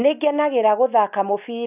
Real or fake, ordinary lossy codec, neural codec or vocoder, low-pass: real; none; none; 3.6 kHz